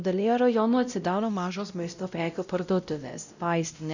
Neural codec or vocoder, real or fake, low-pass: codec, 16 kHz, 0.5 kbps, X-Codec, WavLM features, trained on Multilingual LibriSpeech; fake; 7.2 kHz